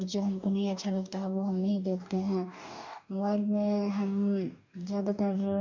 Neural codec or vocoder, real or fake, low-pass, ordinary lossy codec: codec, 44.1 kHz, 2.6 kbps, DAC; fake; 7.2 kHz; none